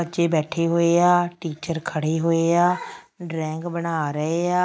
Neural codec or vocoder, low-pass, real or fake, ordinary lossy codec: none; none; real; none